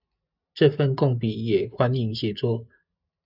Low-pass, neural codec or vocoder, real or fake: 5.4 kHz; none; real